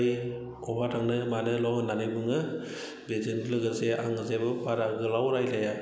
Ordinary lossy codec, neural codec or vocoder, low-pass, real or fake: none; none; none; real